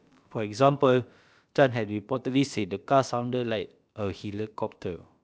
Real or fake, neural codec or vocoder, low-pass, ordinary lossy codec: fake; codec, 16 kHz, about 1 kbps, DyCAST, with the encoder's durations; none; none